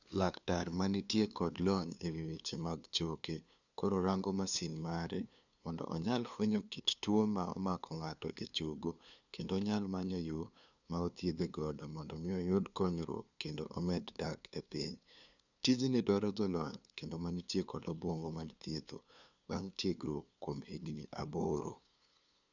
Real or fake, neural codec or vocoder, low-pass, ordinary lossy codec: fake; codec, 16 kHz, 2 kbps, FunCodec, trained on LibriTTS, 25 frames a second; 7.2 kHz; none